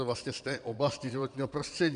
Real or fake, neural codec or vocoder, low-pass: fake; vocoder, 22.05 kHz, 80 mel bands, Vocos; 9.9 kHz